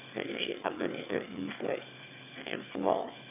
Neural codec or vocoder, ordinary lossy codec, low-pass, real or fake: autoencoder, 22.05 kHz, a latent of 192 numbers a frame, VITS, trained on one speaker; none; 3.6 kHz; fake